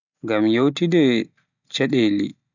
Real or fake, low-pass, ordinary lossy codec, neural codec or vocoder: real; 7.2 kHz; none; none